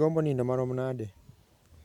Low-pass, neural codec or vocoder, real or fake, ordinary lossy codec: 19.8 kHz; none; real; none